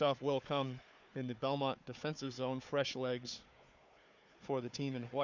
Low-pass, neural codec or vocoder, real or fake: 7.2 kHz; codec, 16 kHz, 4 kbps, FunCodec, trained on Chinese and English, 50 frames a second; fake